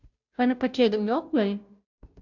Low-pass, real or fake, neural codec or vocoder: 7.2 kHz; fake; codec, 16 kHz, 0.5 kbps, FunCodec, trained on Chinese and English, 25 frames a second